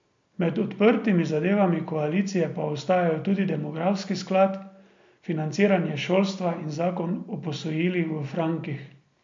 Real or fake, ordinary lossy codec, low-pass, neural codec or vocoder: real; MP3, 48 kbps; 7.2 kHz; none